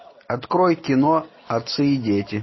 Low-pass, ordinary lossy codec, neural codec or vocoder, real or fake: 7.2 kHz; MP3, 24 kbps; none; real